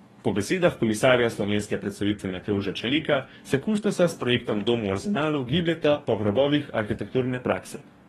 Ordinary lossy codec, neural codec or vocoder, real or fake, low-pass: AAC, 32 kbps; codec, 44.1 kHz, 2.6 kbps, DAC; fake; 19.8 kHz